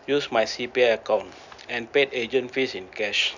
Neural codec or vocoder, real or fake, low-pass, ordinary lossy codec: none; real; 7.2 kHz; none